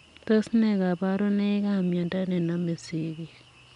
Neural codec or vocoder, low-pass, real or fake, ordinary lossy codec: none; 10.8 kHz; real; none